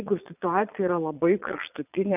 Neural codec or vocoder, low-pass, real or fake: vocoder, 22.05 kHz, 80 mel bands, Vocos; 3.6 kHz; fake